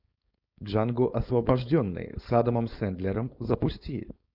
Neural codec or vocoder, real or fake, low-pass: codec, 16 kHz, 4.8 kbps, FACodec; fake; 5.4 kHz